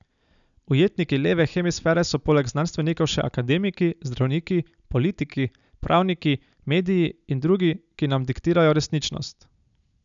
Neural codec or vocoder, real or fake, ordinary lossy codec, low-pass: none; real; none; 7.2 kHz